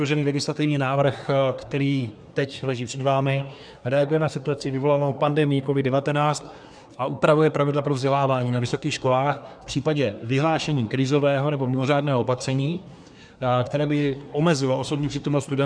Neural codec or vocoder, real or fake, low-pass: codec, 24 kHz, 1 kbps, SNAC; fake; 9.9 kHz